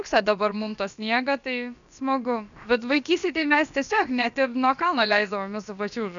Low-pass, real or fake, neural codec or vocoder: 7.2 kHz; fake; codec, 16 kHz, about 1 kbps, DyCAST, with the encoder's durations